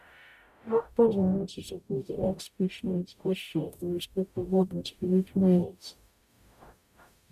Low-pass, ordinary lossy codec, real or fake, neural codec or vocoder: 14.4 kHz; none; fake; codec, 44.1 kHz, 0.9 kbps, DAC